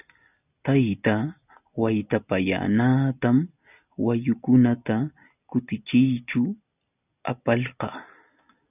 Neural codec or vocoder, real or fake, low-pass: none; real; 3.6 kHz